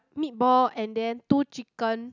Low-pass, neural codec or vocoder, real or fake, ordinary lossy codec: 7.2 kHz; none; real; none